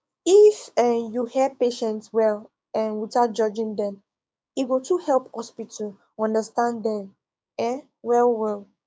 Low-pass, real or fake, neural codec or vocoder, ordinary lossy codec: none; fake; codec, 16 kHz, 6 kbps, DAC; none